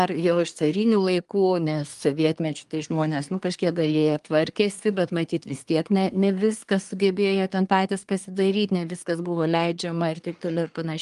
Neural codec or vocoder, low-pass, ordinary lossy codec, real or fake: codec, 24 kHz, 1 kbps, SNAC; 10.8 kHz; Opus, 32 kbps; fake